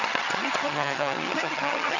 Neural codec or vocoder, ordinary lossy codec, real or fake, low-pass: vocoder, 22.05 kHz, 80 mel bands, HiFi-GAN; none; fake; 7.2 kHz